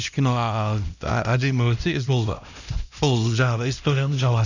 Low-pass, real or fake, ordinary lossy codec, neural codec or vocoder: 7.2 kHz; fake; none; codec, 16 kHz, 1 kbps, X-Codec, HuBERT features, trained on LibriSpeech